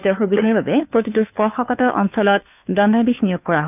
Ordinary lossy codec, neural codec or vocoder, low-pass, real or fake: none; codec, 16 kHz, 2 kbps, FunCodec, trained on Chinese and English, 25 frames a second; 3.6 kHz; fake